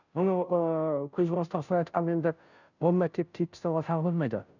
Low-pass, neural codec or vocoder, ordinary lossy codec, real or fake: 7.2 kHz; codec, 16 kHz, 0.5 kbps, FunCodec, trained on Chinese and English, 25 frames a second; none; fake